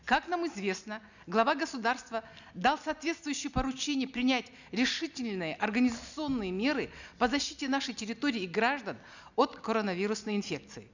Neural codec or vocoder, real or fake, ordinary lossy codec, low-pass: none; real; none; 7.2 kHz